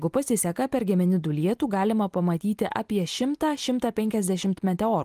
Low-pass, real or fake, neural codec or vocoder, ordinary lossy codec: 14.4 kHz; real; none; Opus, 24 kbps